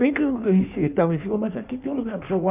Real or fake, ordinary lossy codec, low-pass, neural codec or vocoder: fake; none; 3.6 kHz; codec, 16 kHz in and 24 kHz out, 1.1 kbps, FireRedTTS-2 codec